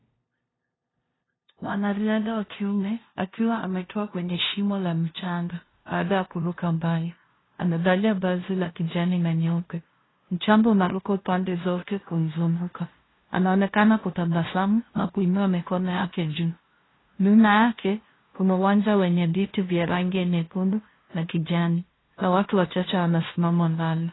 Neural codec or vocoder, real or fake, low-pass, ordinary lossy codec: codec, 16 kHz, 0.5 kbps, FunCodec, trained on LibriTTS, 25 frames a second; fake; 7.2 kHz; AAC, 16 kbps